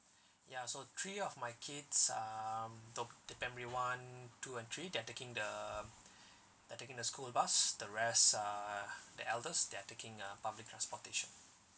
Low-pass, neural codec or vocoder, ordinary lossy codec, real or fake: none; none; none; real